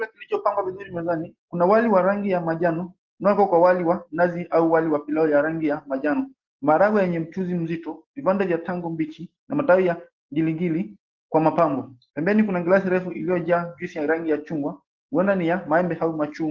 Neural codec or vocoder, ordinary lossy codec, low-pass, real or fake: none; Opus, 16 kbps; 7.2 kHz; real